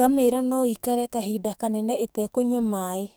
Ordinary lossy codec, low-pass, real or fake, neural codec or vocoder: none; none; fake; codec, 44.1 kHz, 2.6 kbps, SNAC